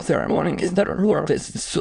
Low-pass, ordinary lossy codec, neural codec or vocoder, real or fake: 9.9 kHz; Opus, 64 kbps; autoencoder, 22.05 kHz, a latent of 192 numbers a frame, VITS, trained on many speakers; fake